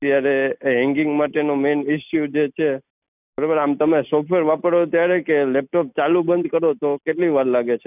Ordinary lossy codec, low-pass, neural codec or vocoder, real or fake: none; 3.6 kHz; none; real